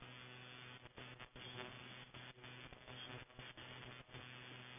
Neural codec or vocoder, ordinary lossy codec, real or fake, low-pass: none; none; real; 3.6 kHz